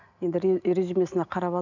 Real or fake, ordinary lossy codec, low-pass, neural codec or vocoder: real; none; 7.2 kHz; none